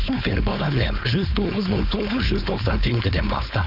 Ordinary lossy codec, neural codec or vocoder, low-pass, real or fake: none; codec, 16 kHz, 4.8 kbps, FACodec; 5.4 kHz; fake